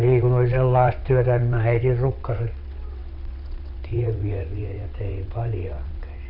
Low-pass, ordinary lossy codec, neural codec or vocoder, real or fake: 5.4 kHz; none; vocoder, 22.05 kHz, 80 mel bands, WaveNeXt; fake